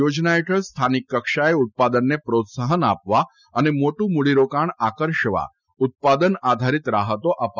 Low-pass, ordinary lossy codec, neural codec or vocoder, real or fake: 7.2 kHz; none; none; real